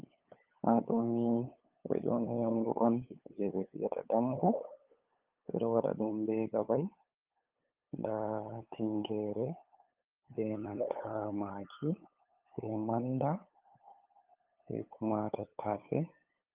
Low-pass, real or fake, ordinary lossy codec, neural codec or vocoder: 3.6 kHz; fake; Opus, 24 kbps; codec, 16 kHz, 8 kbps, FunCodec, trained on LibriTTS, 25 frames a second